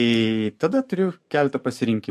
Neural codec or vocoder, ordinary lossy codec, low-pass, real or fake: codec, 44.1 kHz, 7.8 kbps, DAC; MP3, 64 kbps; 14.4 kHz; fake